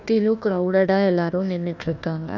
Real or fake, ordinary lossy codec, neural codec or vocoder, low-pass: fake; none; codec, 16 kHz, 1 kbps, FunCodec, trained on Chinese and English, 50 frames a second; 7.2 kHz